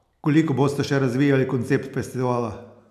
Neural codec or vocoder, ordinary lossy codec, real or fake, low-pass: none; none; real; 14.4 kHz